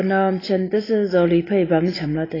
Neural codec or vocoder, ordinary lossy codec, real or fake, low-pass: none; AAC, 24 kbps; real; 5.4 kHz